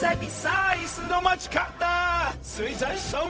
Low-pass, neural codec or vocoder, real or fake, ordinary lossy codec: none; codec, 16 kHz, 0.4 kbps, LongCat-Audio-Codec; fake; none